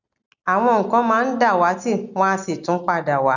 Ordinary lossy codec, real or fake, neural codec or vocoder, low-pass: none; real; none; 7.2 kHz